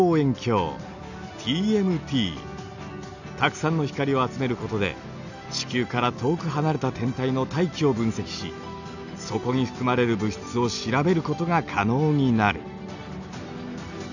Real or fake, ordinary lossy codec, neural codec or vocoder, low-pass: real; none; none; 7.2 kHz